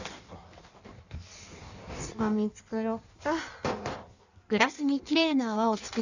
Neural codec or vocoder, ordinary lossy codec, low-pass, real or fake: codec, 16 kHz in and 24 kHz out, 1.1 kbps, FireRedTTS-2 codec; none; 7.2 kHz; fake